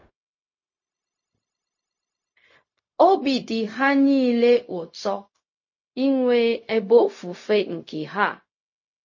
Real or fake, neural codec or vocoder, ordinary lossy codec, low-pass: fake; codec, 16 kHz, 0.4 kbps, LongCat-Audio-Codec; MP3, 32 kbps; 7.2 kHz